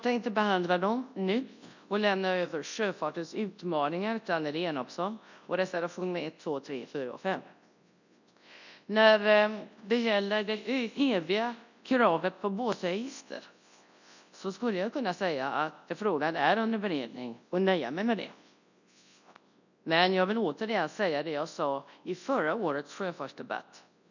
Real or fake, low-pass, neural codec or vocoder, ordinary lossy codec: fake; 7.2 kHz; codec, 24 kHz, 0.9 kbps, WavTokenizer, large speech release; none